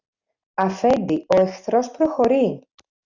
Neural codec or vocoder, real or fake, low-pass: none; real; 7.2 kHz